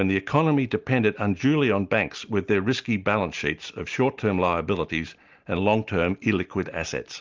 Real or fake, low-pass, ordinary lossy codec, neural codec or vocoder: real; 7.2 kHz; Opus, 32 kbps; none